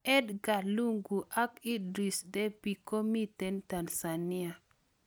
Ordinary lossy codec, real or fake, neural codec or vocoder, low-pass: none; real; none; none